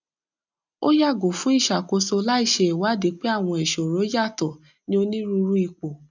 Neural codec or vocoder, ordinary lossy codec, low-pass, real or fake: none; none; 7.2 kHz; real